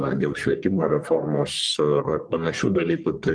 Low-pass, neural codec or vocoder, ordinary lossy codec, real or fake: 9.9 kHz; codec, 44.1 kHz, 1.7 kbps, Pupu-Codec; Opus, 32 kbps; fake